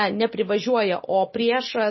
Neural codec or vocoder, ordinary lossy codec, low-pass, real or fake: none; MP3, 24 kbps; 7.2 kHz; real